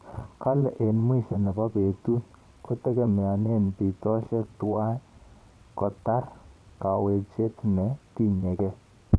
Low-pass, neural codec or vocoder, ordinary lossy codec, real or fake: none; vocoder, 22.05 kHz, 80 mel bands, WaveNeXt; none; fake